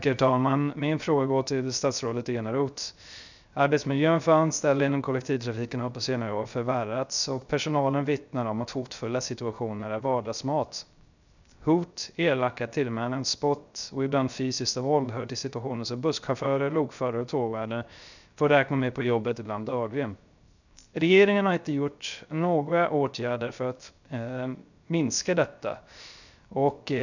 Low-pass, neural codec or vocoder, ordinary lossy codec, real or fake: 7.2 kHz; codec, 16 kHz, 0.3 kbps, FocalCodec; none; fake